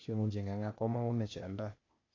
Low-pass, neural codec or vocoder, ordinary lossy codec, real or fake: 7.2 kHz; codec, 16 kHz, 0.8 kbps, ZipCodec; AAC, 32 kbps; fake